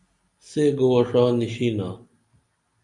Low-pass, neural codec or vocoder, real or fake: 10.8 kHz; none; real